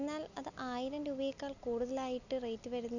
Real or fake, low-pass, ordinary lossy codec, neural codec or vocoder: real; 7.2 kHz; none; none